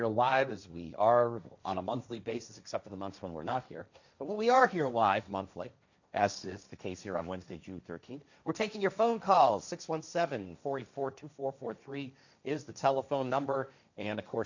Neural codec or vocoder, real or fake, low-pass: codec, 16 kHz, 1.1 kbps, Voila-Tokenizer; fake; 7.2 kHz